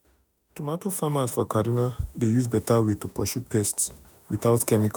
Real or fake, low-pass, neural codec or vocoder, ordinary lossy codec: fake; none; autoencoder, 48 kHz, 32 numbers a frame, DAC-VAE, trained on Japanese speech; none